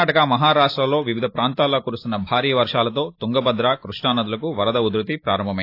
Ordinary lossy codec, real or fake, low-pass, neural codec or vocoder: AAC, 32 kbps; real; 5.4 kHz; none